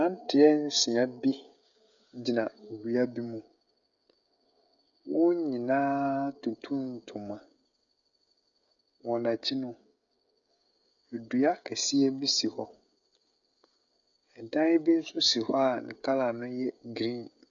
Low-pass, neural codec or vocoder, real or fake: 7.2 kHz; codec, 16 kHz, 16 kbps, FreqCodec, smaller model; fake